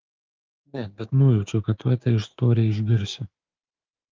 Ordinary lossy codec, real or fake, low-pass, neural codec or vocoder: Opus, 24 kbps; fake; 7.2 kHz; codec, 16 kHz, 6 kbps, DAC